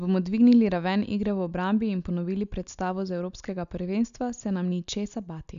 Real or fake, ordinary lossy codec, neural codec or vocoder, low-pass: real; none; none; 7.2 kHz